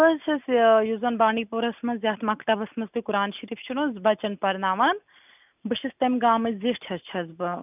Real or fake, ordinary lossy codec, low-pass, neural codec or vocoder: real; none; 3.6 kHz; none